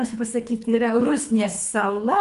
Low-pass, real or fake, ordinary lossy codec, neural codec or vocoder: 10.8 kHz; fake; MP3, 96 kbps; codec, 24 kHz, 3 kbps, HILCodec